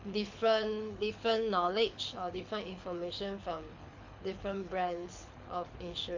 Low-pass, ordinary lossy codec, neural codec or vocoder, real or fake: 7.2 kHz; MP3, 48 kbps; codec, 24 kHz, 6 kbps, HILCodec; fake